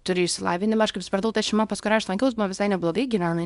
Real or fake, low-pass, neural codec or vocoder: fake; 10.8 kHz; codec, 24 kHz, 0.9 kbps, WavTokenizer, small release